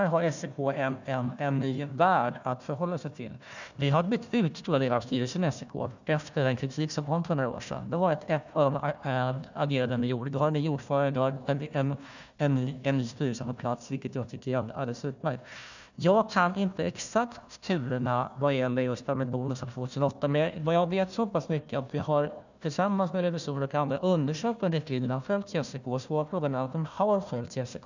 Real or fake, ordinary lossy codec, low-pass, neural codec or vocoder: fake; none; 7.2 kHz; codec, 16 kHz, 1 kbps, FunCodec, trained on Chinese and English, 50 frames a second